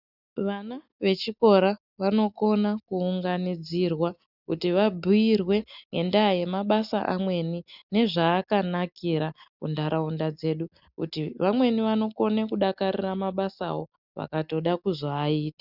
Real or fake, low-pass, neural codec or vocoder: real; 5.4 kHz; none